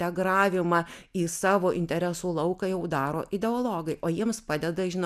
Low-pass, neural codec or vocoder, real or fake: 14.4 kHz; none; real